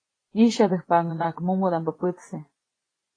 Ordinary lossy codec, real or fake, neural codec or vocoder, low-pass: AAC, 32 kbps; fake; vocoder, 24 kHz, 100 mel bands, Vocos; 9.9 kHz